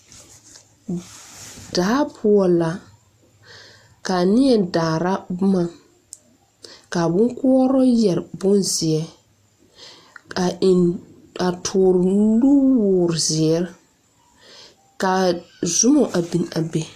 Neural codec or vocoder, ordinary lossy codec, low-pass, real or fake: none; AAC, 64 kbps; 14.4 kHz; real